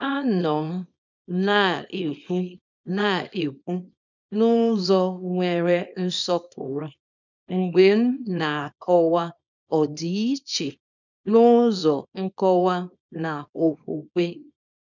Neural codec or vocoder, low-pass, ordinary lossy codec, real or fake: codec, 24 kHz, 0.9 kbps, WavTokenizer, small release; 7.2 kHz; none; fake